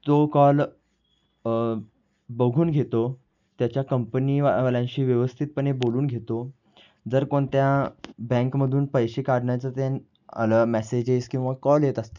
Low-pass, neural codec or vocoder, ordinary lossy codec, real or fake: 7.2 kHz; none; none; real